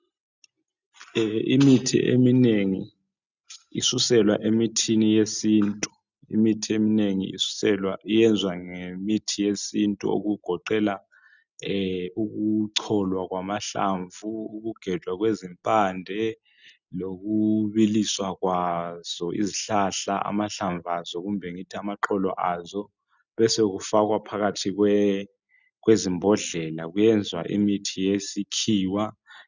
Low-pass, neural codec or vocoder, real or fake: 7.2 kHz; none; real